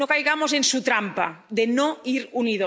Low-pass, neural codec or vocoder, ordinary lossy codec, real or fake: none; none; none; real